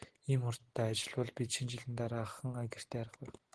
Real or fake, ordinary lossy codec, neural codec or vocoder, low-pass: real; Opus, 16 kbps; none; 10.8 kHz